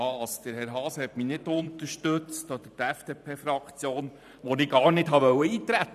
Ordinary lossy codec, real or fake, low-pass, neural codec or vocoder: none; fake; 14.4 kHz; vocoder, 44.1 kHz, 128 mel bands every 512 samples, BigVGAN v2